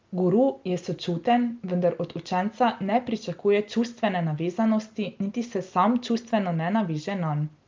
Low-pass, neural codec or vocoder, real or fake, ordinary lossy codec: 7.2 kHz; none; real; Opus, 32 kbps